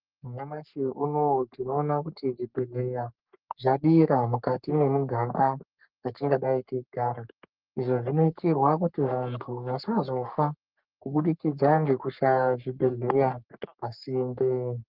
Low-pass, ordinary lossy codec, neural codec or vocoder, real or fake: 5.4 kHz; Opus, 32 kbps; codec, 44.1 kHz, 3.4 kbps, Pupu-Codec; fake